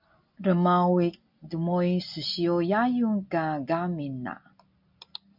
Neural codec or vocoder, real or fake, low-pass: none; real; 5.4 kHz